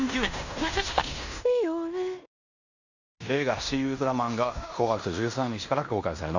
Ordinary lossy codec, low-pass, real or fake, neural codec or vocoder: none; 7.2 kHz; fake; codec, 16 kHz in and 24 kHz out, 0.9 kbps, LongCat-Audio-Codec, fine tuned four codebook decoder